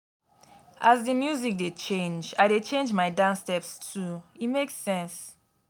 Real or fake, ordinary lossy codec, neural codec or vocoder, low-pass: real; none; none; none